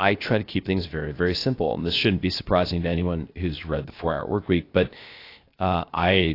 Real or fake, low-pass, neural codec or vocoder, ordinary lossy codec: fake; 5.4 kHz; codec, 16 kHz, 0.7 kbps, FocalCodec; AAC, 32 kbps